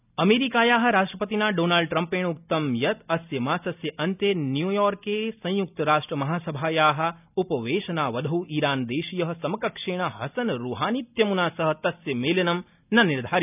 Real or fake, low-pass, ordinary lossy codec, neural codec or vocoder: real; 3.6 kHz; none; none